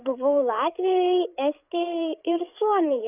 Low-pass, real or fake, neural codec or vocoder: 3.6 kHz; fake; codec, 16 kHz, 8 kbps, FreqCodec, larger model